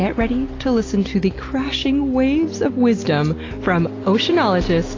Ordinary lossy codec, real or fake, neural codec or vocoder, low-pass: AAC, 32 kbps; real; none; 7.2 kHz